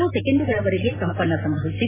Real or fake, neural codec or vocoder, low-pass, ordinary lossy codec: real; none; 3.6 kHz; AAC, 16 kbps